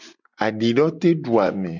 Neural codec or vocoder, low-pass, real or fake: none; 7.2 kHz; real